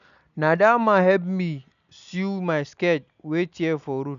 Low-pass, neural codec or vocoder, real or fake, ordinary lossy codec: 7.2 kHz; none; real; none